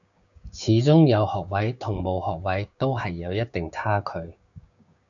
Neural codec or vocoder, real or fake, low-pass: codec, 16 kHz, 6 kbps, DAC; fake; 7.2 kHz